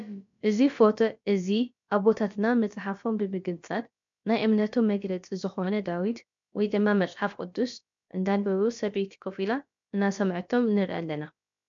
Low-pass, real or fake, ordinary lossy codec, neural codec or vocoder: 7.2 kHz; fake; MP3, 64 kbps; codec, 16 kHz, about 1 kbps, DyCAST, with the encoder's durations